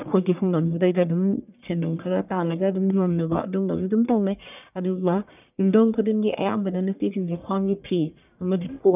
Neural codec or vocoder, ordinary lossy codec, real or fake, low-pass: codec, 44.1 kHz, 1.7 kbps, Pupu-Codec; none; fake; 3.6 kHz